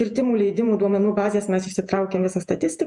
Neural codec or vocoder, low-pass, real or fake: vocoder, 48 kHz, 128 mel bands, Vocos; 10.8 kHz; fake